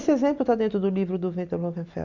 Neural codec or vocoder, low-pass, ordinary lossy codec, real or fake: none; 7.2 kHz; none; real